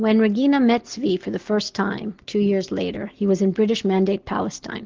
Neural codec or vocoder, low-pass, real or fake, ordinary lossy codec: none; 7.2 kHz; real; Opus, 16 kbps